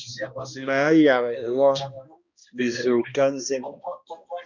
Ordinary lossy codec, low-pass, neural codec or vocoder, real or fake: Opus, 64 kbps; 7.2 kHz; codec, 16 kHz, 1 kbps, X-Codec, HuBERT features, trained on balanced general audio; fake